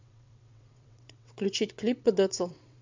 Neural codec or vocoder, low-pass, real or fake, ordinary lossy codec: vocoder, 22.05 kHz, 80 mel bands, WaveNeXt; 7.2 kHz; fake; MP3, 64 kbps